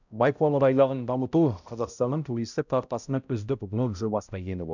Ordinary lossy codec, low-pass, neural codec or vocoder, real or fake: none; 7.2 kHz; codec, 16 kHz, 0.5 kbps, X-Codec, HuBERT features, trained on balanced general audio; fake